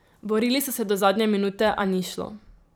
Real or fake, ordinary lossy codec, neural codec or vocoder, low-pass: fake; none; vocoder, 44.1 kHz, 128 mel bands every 512 samples, BigVGAN v2; none